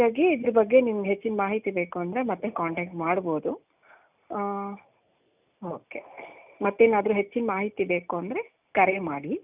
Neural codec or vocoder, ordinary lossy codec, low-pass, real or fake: none; none; 3.6 kHz; real